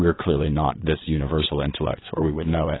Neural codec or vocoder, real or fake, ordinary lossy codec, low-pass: vocoder, 22.05 kHz, 80 mel bands, WaveNeXt; fake; AAC, 16 kbps; 7.2 kHz